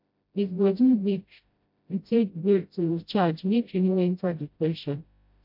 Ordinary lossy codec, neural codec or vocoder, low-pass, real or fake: MP3, 48 kbps; codec, 16 kHz, 0.5 kbps, FreqCodec, smaller model; 5.4 kHz; fake